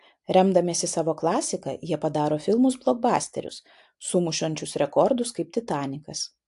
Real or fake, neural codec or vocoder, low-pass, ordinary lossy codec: real; none; 10.8 kHz; AAC, 64 kbps